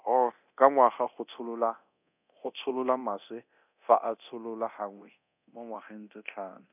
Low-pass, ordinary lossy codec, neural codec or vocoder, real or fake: 3.6 kHz; none; codec, 24 kHz, 0.9 kbps, DualCodec; fake